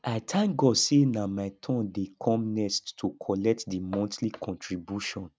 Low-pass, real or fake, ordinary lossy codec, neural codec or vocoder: none; real; none; none